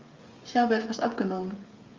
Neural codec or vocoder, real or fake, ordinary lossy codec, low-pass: codec, 16 kHz, 16 kbps, FreqCodec, smaller model; fake; Opus, 32 kbps; 7.2 kHz